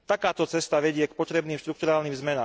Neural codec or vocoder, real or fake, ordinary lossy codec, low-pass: none; real; none; none